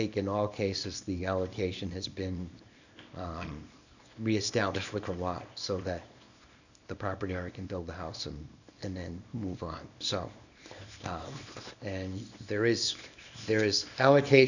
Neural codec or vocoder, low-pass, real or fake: codec, 24 kHz, 0.9 kbps, WavTokenizer, small release; 7.2 kHz; fake